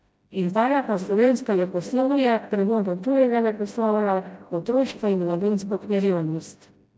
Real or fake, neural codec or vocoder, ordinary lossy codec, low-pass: fake; codec, 16 kHz, 0.5 kbps, FreqCodec, smaller model; none; none